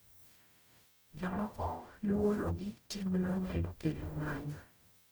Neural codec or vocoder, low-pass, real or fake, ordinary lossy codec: codec, 44.1 kHz, 0.9 kbps, DAC; none; fake; none